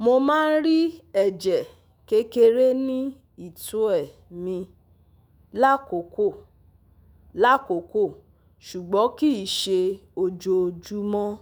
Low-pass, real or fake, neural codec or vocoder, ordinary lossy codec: none; fake; autoencoder, 48 kHz, 128 numbers a frame, DAC-VAE, trained on Japanese speech; none